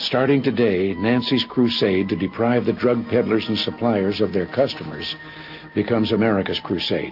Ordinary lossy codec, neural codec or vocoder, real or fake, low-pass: AAC, 48 kbps; none; real; 5.4 kHz